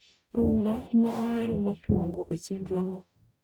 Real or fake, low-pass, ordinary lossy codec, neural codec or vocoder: fake; none; none; codec, 44.1 kHz, 0.9 kbps, DAC